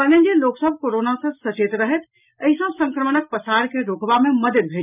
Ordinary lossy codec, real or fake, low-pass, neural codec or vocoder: none; real; 3.6 kHz; none